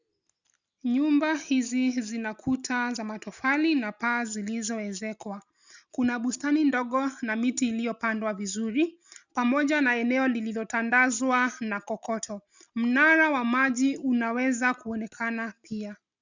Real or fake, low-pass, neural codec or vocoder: real; 7.2 kHz; none